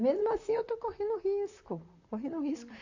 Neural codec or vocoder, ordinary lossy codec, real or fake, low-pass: vocoder, 44.1 kHz, 128 mel bands, Pupu-Vocoder; AAC, 48 kbps; fake; 7.2 kHz